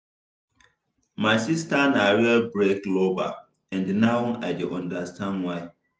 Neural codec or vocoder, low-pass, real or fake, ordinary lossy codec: none; none; real; none